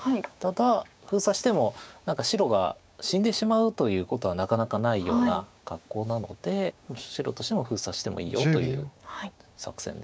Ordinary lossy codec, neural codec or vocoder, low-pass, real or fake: none; codec, 16 kHz, 6 kbps, DAC; none; fake